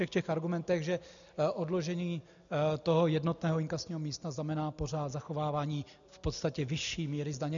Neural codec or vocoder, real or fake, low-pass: none; real; 7.2 kHz